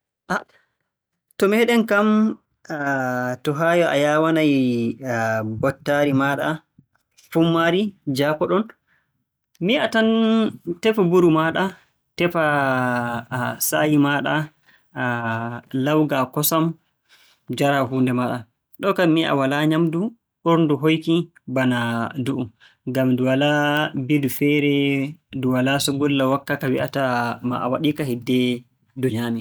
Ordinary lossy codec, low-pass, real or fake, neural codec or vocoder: none; none; real; none